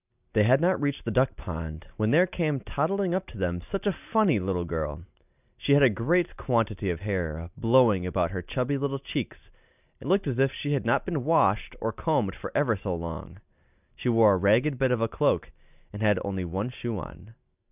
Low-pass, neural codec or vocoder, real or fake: 3.6 kHz; none; real